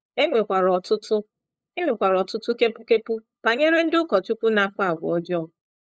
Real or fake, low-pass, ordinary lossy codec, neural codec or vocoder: fake; none; none; codec, 16 kHz, 8 kbps, FunCodec, trained on LibriTTS, 25 frames a second